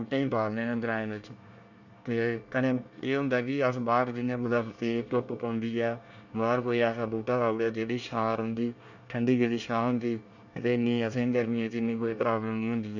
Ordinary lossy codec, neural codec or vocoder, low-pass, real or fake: none; codec, 24 kHz, 1 kbps, SNAC; 7.2 kHz; fake